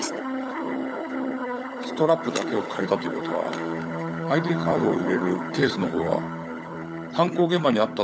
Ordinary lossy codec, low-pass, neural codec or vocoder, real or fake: none; none; codec, 16 kHz, 16 kbps, FunCodec, trained on LibriTTS, 50 frames a second; fake